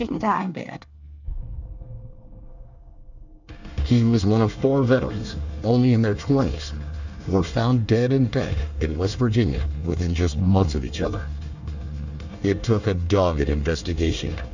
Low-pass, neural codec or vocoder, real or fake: 7.2 kHz; codec, 24 kHz, 1 kbps, SNAC; fake